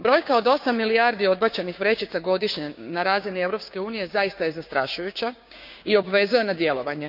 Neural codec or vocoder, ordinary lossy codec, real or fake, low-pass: codec, 16 kHz, 6 kbps, DAC; none; fake; 5.4 kHz